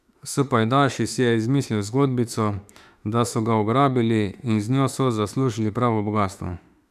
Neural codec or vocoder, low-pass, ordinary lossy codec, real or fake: autoencoder, 48 kHz, 32 numbers a frame, DAC-VAE, trained on Japanese speech; 14.4 kHz; none; fake